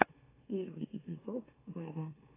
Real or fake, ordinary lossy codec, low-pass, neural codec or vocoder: fake; AAC, 16 kbps; 3.6 kHz; autoencoder, 44.1 kHz, a latent of 192 numbers a frame, MeloTTS